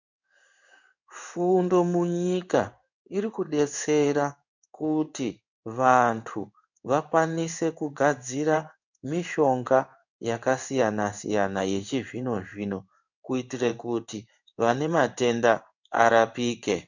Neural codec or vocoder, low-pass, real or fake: codec, 16 kHz in and 24 kHz out, 1 kbps, XY-Tokenizer; 7.2 kHz; fake